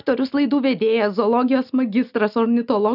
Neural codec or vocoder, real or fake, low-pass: none; real; 5.4 kHz